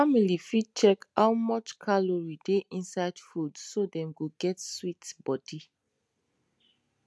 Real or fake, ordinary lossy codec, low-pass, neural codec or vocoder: real; none; none; none